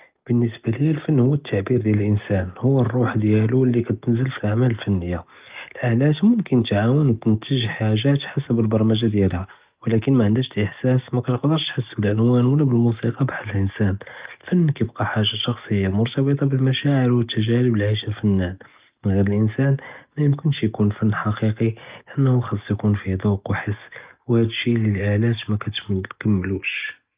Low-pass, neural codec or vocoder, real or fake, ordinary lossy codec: 3.6 kHz; none; real; Opus, 32 kbps